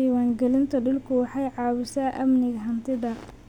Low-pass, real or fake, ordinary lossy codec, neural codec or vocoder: 19.8 kHz; real; none; none